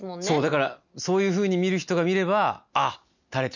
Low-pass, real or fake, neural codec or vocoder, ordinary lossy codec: 7.2 kHz; real; none; none